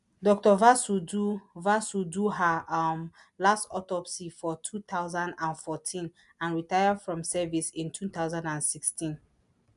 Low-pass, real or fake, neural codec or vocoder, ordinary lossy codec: 10.8 kHz; real; none; none